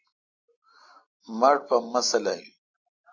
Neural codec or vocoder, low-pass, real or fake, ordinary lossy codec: none; 7.2 kHz; real; MP3, 48 kbps